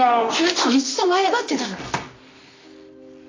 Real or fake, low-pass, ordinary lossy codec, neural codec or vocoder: fake; 7.2 kHz; AAC, 32 kbps; codec, 24 kHz, 0.9 kbps, WavTokenizer, medium music audio release